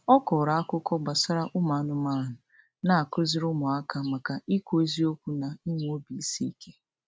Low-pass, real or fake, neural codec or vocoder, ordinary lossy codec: none; real; none; none